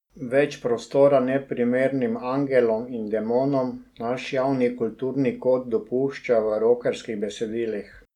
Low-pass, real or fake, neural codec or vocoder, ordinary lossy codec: 19.8 kHz; real; none; none